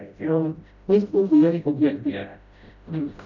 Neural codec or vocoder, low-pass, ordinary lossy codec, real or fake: codec, 16 kHz, 0.5 kbps, FreqCodec, smaller model; 7.2 kHz; none; fake